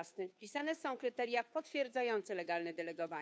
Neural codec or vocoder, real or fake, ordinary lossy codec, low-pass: codec, 16 kHz, 2 kbps, FunCodec, trained on Chinese and English, 25 frames a second; fake; none; none